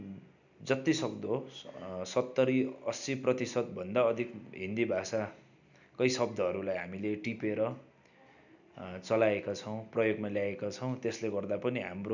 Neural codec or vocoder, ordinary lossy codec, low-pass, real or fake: none; none; 7.2 kHz; real